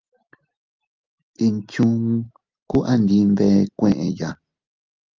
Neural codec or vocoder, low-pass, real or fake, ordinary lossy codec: none; 7.2 kHz; real; Opus, 32 kbps